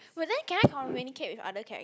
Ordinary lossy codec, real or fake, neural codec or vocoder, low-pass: none; real; none; none